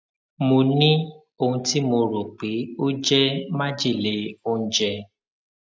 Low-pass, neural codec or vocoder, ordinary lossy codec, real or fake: none; none; none; real